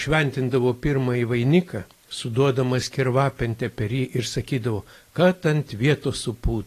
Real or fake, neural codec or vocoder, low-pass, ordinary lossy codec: fake; vocoder, 44.1 kHz, 128 mel bands every 256 samples, BigVGAN v2; 14.4 kHz; AAC, 48 kbps